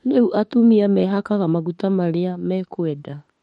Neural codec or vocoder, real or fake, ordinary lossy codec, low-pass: autoencoder, 48 kHz, 32 numbers a frame, DAC-VAE, trained on Japanese speech; fake; MP3, 48 kbps; 19.8 kHz